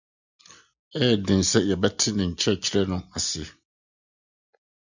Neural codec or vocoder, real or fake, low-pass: none; real; 7.2 kHz